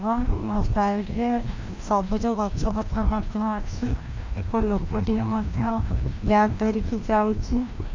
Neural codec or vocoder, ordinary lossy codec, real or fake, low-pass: codec, 16 kHz, 1 kbps, FreqCodec, larger model; MP3, 64 kbps; fake; 7.2 kHz